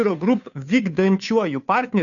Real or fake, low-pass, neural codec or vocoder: fake; 7.2 kHz; codec, 16 kHz, 2 kbps, FunCodec, trained on Chinese and English, 25 frames a second